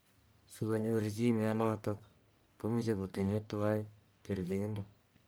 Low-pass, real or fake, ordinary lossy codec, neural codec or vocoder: none; fake; none; codec, 44.1 kHz, 1.7 kbps, Pupu-Codec